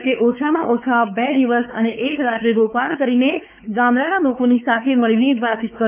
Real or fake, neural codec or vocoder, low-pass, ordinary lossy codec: fake; codec, 16 kHz, 4 kbps, X-Codec, WavLM features, trained on Multilingual LibriSpeech; 3.6 kHz; none